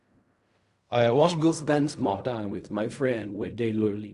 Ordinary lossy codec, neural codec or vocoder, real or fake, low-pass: Opus, 64 kbps; codec, 16 kHz in and 24 kHz out, 0.4 kbps, LongCat-Audio-Codec, fine tuned four codebook decoder; fake; 10.8 kHz